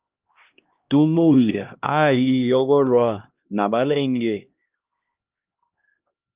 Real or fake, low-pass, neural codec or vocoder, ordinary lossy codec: fake; 3.6 kHz; codec, 16 kHz, 1 kbps, X-Codec, HuBERT features, trained on LibriSpeech; Opus, 24 kbps